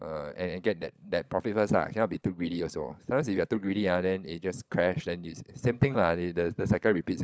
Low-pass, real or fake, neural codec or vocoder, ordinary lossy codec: none; fake; codec, 16 kHz, 16 kbps, FunCodec, trained on LibriTTS, 50 frames a second; none